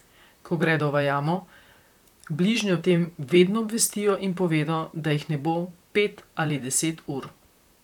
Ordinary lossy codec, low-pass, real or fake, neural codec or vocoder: none; 19.8 kHz; fake; vocoder, 44.1 kHz, 128 mel bands, Pupu-Vocoder